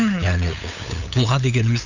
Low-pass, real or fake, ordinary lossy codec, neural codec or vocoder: 7.2 kHz; fake; none; codec, 16 kHz, 8 kbps, FunCodec, trained on LibriTTS, 25 frames a second